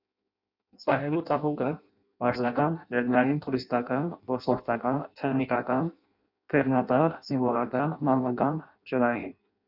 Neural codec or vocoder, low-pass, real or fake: codec, 16 kHz in and 24 kHz out, 0.6 kbps, FireRedTTS-2 codec; 5.4 kHz; fake